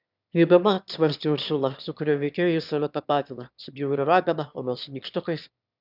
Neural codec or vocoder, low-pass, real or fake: autoencoder, 22.05 kHz, a latent of 192 numbers a frame, VITS, trained on one speaker; 5.4 kHz; fake